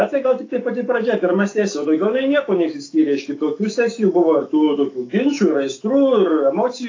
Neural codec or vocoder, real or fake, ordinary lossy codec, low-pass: autoencoder, 48 kHz, 128 numbers a frame, DAC-VAE, trained on Japanese speech; fake; AAC, 32 kbps; 7.2 kHz